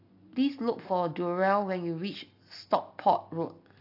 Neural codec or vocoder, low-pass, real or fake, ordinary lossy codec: none; 5.4 kHz; real; AAC, 32 kbps